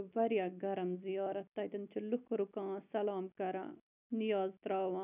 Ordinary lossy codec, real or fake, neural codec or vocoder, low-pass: none; real; none; 3.6 kHz